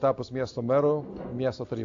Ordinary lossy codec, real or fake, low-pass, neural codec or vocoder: AAC, 48 kbps; real; 7.2 kHz; none